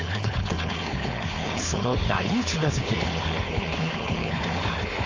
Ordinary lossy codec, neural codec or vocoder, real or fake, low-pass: AAC, 48 kbps; codec, 16 kHz, 4.8 kbps, FACodec; fake; 7.2 kHz